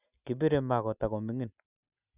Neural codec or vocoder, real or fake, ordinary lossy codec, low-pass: none; real; none; 3.6 kHz